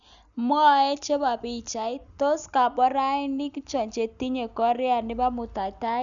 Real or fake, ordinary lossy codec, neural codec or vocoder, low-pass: real; none; none; 7.2 kHz